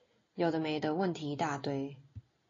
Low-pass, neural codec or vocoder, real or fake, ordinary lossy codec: 7.2 kHz; none; real; AAC, 32 kbps